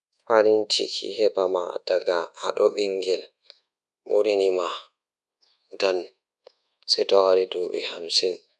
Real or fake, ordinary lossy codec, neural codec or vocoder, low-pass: fake; none; codec, 24 kHz, 1.2 kbps, DualCodec; none